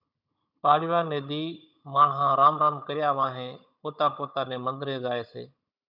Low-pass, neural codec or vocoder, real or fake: 5.4 kHz; codec, 16 kHz, 16 kbps, FunCodec, trained on Chinese and English, 50 frames a second; fake